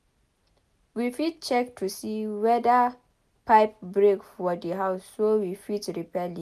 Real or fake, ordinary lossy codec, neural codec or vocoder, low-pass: real; none; none; 14.4 kHz